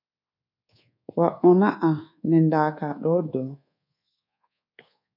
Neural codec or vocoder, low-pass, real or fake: codec, 24 kHz, 1.2 kbps, DualCodec; 5.4 kHz; fake